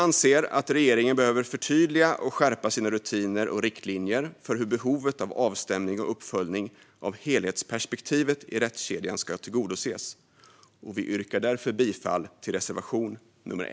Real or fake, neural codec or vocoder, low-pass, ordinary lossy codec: real; none; none; none